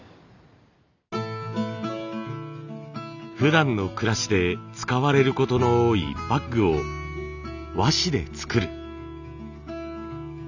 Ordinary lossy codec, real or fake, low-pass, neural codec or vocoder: none; real; 7.2 kHz; none